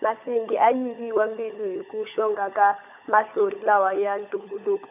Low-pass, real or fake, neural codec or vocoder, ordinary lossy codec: 3.6 kHz; fake; codec, 16 kHz, 16 kbps, FunCodec, trained on LibriTTS, 50 frames a second; none